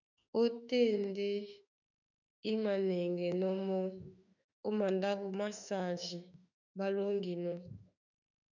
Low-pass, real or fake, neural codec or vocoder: 7.2 kHz; fake; autoencoder, 48 kHz, 32 numbers a frame, DAC-VAE, trained on Japanese speech